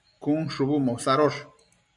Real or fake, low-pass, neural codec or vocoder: fake; 10.8 kHz; vocoder, 44.1 kHz, 128 mel bands every 256 samples, BigVGAN v2